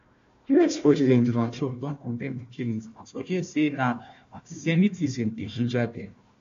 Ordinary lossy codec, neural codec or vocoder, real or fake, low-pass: AAC, 48 kbps; codec, 16 kHz, 1 kbps, FunCodec, trained on Chinese and English, 50 frames a second; fake; 7.2 kHz